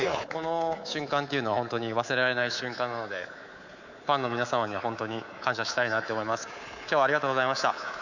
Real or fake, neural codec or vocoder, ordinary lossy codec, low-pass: fake; codec, 24 kHz, 3.1 kbps, DualCodec; none; 7.2 kHz